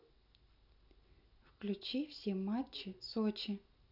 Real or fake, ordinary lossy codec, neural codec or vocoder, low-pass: real; none; none; 5.4 kHz